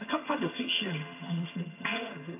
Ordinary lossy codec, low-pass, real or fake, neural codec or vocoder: AAC, 24 kbps; 3.6 kHz; fake; vocoder, 22.05 kHz, 80 mel bands, HiFi-GAN